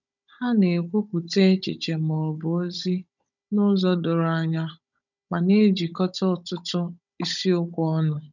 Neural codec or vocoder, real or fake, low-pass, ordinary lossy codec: codec, 16 kHz, 16 kbps, FunCodec, trained on Chinese and English, 50 frames a second; fake; 7.2 kHz; none